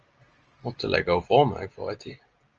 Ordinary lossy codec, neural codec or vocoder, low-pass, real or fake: Opus, 24 kbps; none; 7.2 kHz; real